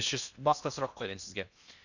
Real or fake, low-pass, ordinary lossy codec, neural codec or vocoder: fake; 7.2 kHz; none; codec, 16 kHz in and 24 kHz out, 0.8 kbps, FocalCodec, streaming, 65536 codes